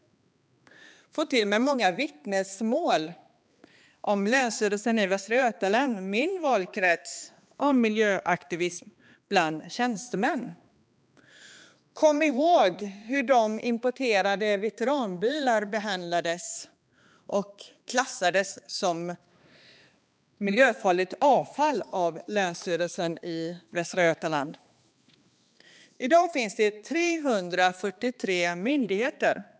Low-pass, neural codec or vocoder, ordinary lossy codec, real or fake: none; codec, 16 kHz, 2 kbps, X-Codec, HuBERT features, trained on balanced general audio; none; fake